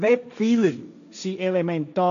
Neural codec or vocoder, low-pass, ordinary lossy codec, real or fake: codec, 16 kHz, 1.1 kbps, Voila-Tokenizer; 7.2 kHz; none; fake